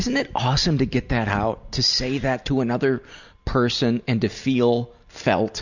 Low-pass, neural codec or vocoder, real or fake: 7.2 kHz; none; real